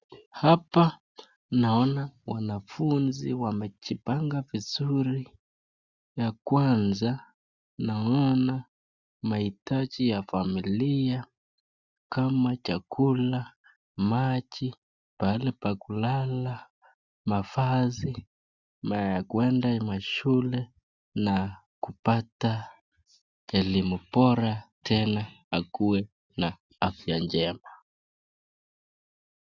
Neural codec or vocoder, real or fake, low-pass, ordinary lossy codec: none; real; 7.2 kHz; Opus, 64 kbps